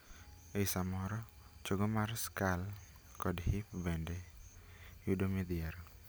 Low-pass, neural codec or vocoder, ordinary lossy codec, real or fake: none; none; none; real